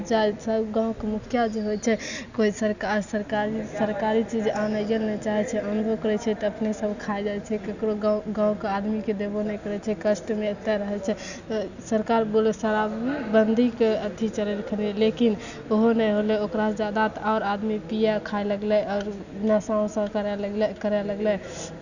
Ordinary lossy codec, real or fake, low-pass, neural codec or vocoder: none; real; 7.2 kHz; none